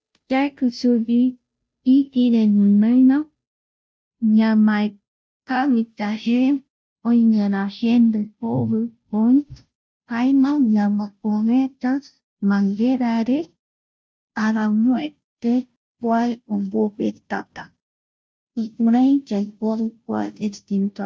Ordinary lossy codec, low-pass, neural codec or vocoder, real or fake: none; none; codec, 16 kHz, 0.5 kbps, FunCodec, trained on Chinese and English, 25 frames a second; fake